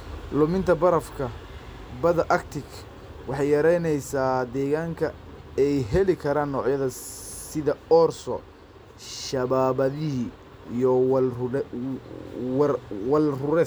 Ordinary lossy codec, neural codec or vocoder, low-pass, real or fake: none; none; none; real